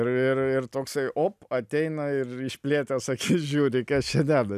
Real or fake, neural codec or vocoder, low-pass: real; none; 14.4 kHz